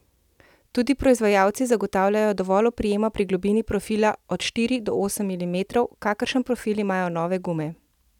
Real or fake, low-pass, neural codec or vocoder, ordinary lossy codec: real; 19.8 kHz; none; none